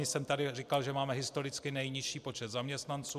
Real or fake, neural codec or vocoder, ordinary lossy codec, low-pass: real; none; MP3, 96 kbps; 14.4 kHz